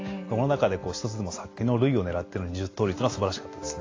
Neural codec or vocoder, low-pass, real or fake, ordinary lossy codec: none; 7.2 kHz; real; AAC, 32 kbps